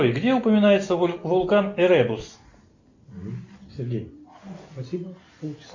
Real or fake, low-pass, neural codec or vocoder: real; 7.2 kHz; none